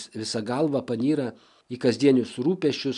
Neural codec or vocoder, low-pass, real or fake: none; 10.8 kHz; real